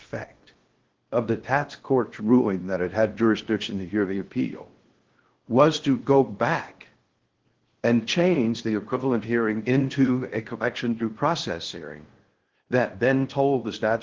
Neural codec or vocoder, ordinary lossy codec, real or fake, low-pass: codec, 16 kHz in and 24 kHz out, 0.6 kbps, FocalCodec, streaming, 2048 codes; Opus, 16 kbps; fake; 7.2 kHz